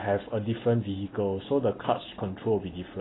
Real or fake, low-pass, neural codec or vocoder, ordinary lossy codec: real; 7.2 kHz; none; AAC, 16 kbps